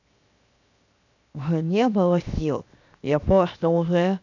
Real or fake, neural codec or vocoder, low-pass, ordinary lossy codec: fake; codec, 16 kHz, 0.7 kbps, FocalCodec; 7.2 kHz; none